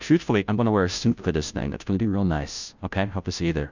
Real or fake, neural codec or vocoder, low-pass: fake; codec, 16 kHz, 0.5 kbps, FunCodec, trained on Chinese and English, 25 frames a second; 7.2 kHz